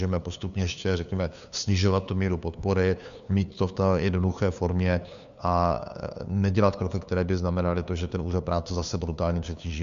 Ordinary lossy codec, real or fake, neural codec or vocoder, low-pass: MP3, 96 kbps; fake; codec, 16 kHz, 2 kbps, FunCodec, trained on LibriTTS, 25 frames a second; 7.2 kHz